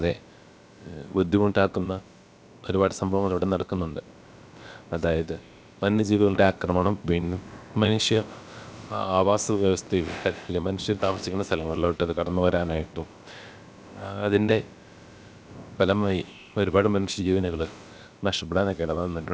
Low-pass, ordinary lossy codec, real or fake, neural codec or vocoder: none; none; fake; codec, 16 kHz, about 1 kbps, DyCAST, with the encoder's durations